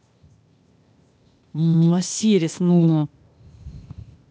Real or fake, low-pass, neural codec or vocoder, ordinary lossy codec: fake; none; codec, 16 kHz, 0.8 kbps, ZipCodec; none